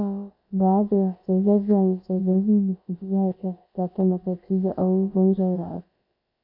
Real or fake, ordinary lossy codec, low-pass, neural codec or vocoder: fake; MP3, 32 kbps; 5.4 kHz; codec, 16 kHz, about 1 kbps, DyCAST, with the encoder's durations